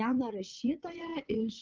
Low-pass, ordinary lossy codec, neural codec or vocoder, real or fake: 7.2 kHz; Opus, 32 kbps; vocoder, 22.05 kHz, 80 mel bands, WaveNeXt; fake